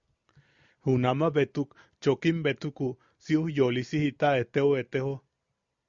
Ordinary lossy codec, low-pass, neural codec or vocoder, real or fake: Opus, 64 kbps; 7.2 kHz; none; real